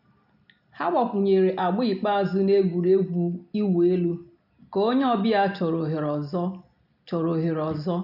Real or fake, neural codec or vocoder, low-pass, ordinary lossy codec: real; none; 5.4 kHz; none